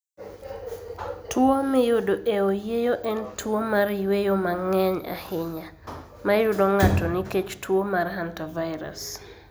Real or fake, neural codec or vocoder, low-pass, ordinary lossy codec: real; none; none; none